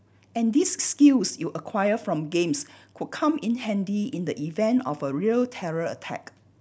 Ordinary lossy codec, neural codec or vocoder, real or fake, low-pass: none; none; real; none